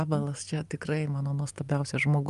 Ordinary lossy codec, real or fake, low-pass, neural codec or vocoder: Opus, 32 kbps; real; 10.8 kHz; none